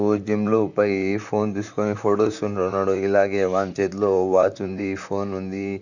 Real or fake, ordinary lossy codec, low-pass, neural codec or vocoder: fake; none; 7.2 kHz; vocoder, 44.1 kHz, 128 mel bands, Pupu-Vocoder